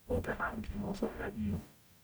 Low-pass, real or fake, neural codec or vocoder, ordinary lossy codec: none; fake; codec, 44.1 kHz, 0.9 kbps, DAC; none